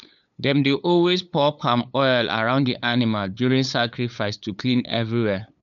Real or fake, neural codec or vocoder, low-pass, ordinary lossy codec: fake; codec, 16 kHz, 8 kbps, FunCodec, trained on LibriTTS, 25 frames a second; 7.2 kHz; none